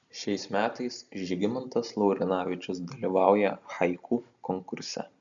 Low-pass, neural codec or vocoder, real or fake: 7.2 kHz; none; real